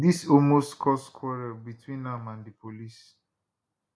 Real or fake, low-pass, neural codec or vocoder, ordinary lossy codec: real; none; none; none